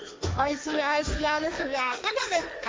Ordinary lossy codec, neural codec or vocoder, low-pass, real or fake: none; codec, 16 kHz, 1.1 kbps, Voila-Tokenizer; none; fake